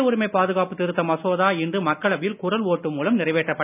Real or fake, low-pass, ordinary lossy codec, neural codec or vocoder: real; 3.6 kHz; none; none